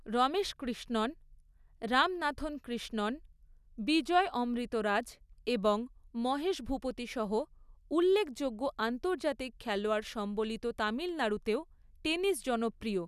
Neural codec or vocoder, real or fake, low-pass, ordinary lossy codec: none; real; 14.4 kHz; none